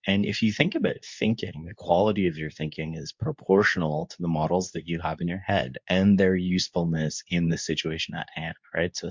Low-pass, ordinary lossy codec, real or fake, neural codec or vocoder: 7.2 kHz; MP3, 64 kbps; fake; codec, 24 kHz, 0.9 kbps, WavTokenizer, medium speech release version 2